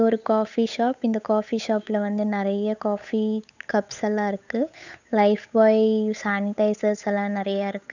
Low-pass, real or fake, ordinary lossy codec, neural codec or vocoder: 7.2 kHz; fake; none; codec, 16 kHz, 8 kbps, FunCodec, trained on Chinese and English, 25 frames a second